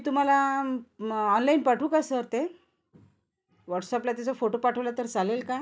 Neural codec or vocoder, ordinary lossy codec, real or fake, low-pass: none; none; real; none